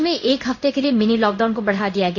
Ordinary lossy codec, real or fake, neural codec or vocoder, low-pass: none; fake; codec, 16 kHz in and 24 kHz out, 1 kbps, XY-Tokenizer; 7.2 kHz